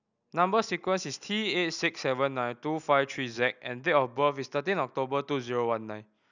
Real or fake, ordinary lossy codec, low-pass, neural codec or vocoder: real; none; 7.2 kHz; none